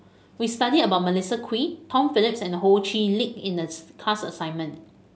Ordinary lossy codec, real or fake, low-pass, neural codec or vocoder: none; real; none; none